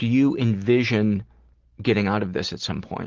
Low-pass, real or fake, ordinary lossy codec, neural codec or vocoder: 7.2 kHz; real; Opus, 16 kbps; none